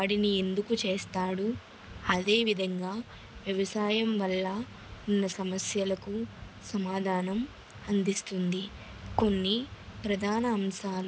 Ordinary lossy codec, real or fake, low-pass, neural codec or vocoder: none; real; none; none